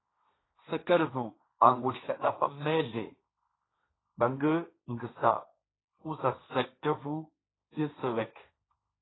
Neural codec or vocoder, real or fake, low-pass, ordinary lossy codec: codec, 16 kHz, 1.1 kbps, Voila-Tokenizer; fake; 7.2 kHz; AAC, 16 kbps